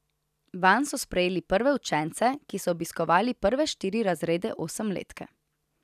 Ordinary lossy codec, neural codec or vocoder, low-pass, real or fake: none; none; 14.4 kHz; real